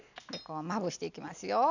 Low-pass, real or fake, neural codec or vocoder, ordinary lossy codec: 7.2 kHz; real; none; none